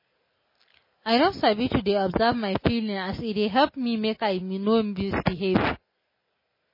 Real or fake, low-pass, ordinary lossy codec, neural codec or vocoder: real; 5.4 kHz; MP3, 24 kbps; none